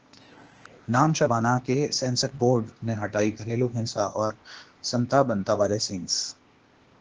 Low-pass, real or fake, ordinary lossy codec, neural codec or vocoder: 7.2 kHz; fake; Opus, 16 kbps; codec, 16 kHz, 0.8 kbps, ZipCodec